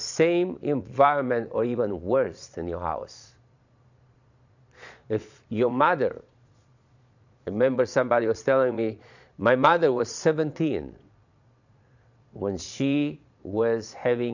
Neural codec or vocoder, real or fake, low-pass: vocoder, 22.05 kHz, 80 mel bands, Vocos; fake; 7.2 kHz